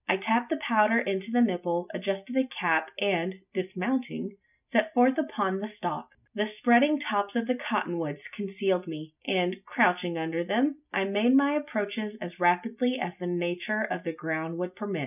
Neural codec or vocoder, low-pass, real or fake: none; 3.6 kHz; real